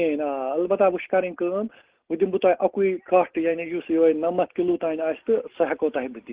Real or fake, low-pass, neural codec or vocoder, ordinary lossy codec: real; 3.6 kHz; none; Opus, 16 kbps